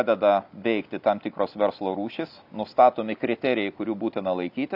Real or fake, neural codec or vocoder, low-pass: real; none; 5.4 kHz